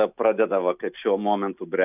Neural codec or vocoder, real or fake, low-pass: none; real; 3.6 kHz